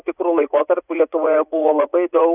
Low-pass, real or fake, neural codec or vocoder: 3.6 kHz; fake; vocoder, 44.1 kHz, 80 mel bands, Vocos